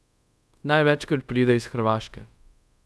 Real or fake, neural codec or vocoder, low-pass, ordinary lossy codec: fake; codec, 24 kHz, 0.5 kbps, DualCodec; none; none